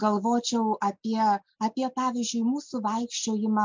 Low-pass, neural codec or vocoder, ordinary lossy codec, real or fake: 7.2 kHz; none; MP3, 64 kbps; real